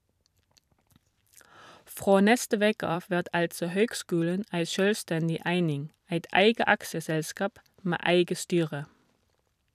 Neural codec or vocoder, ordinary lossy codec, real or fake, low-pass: vocoder, 44.1 kHz, 128 mel bands every 256 samples, BigVGAN v2; none; fake; 14.4 kHz